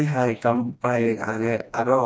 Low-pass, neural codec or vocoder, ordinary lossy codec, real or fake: none; codec, 16 kHz, 1 kbps, FreqCodec, smaller model; none; fake